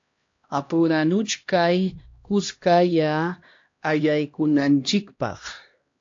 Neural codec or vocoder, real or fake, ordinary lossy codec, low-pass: codec, 16 kHz, 1 kbps, X-Codec, HuBERT features, trained on LibriSpeech; fake; AAC, 48 kbps; 7.2 kHz